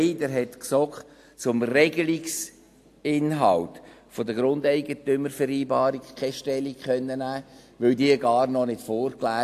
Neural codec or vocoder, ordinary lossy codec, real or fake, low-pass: none; AAC, 64 kbps; real; 14.4 kHz